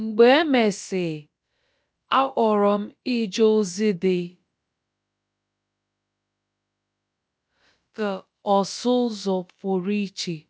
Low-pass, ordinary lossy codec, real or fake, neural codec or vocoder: none; none; fake; codec, 16 kHz, about 1 kbps, DyCAST, with the encoder's durations